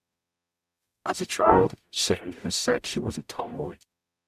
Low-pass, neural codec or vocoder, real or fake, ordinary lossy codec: 14.4 kHz; codec, 44.1 kHz, 0.9 kbps, DAC; fake; AAC, 96 kbps